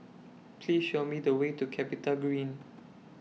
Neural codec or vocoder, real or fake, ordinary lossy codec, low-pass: none; real; none; none